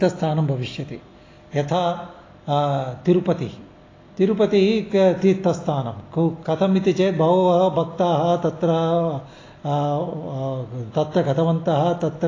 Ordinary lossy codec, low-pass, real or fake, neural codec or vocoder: AAC, 32 kbps; 7.2 kHz; real; none